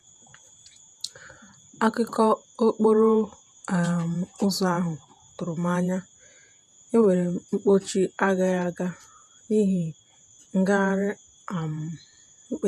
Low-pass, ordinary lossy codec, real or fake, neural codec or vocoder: 14.4 kHz; none; fake; vocoder, 48 kHz, 128 mel bands, Vocos